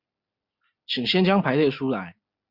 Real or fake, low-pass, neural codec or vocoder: real; 5.4 kHz; none